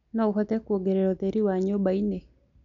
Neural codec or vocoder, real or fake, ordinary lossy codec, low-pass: none; real; MP3, 96 kbps; 7.2 kHz